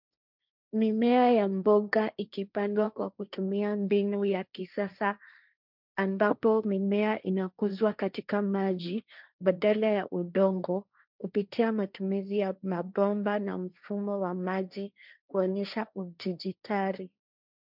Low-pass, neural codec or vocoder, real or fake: 5.4 kHz; codec, 16 kHz, 1.1 kbps, Voila-Tokenizer; fake